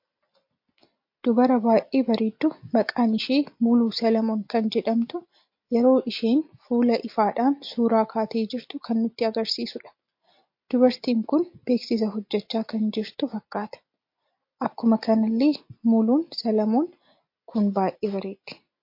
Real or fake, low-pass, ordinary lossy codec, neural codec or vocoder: real; 5.4 kHz; MP3, 32 kbps; none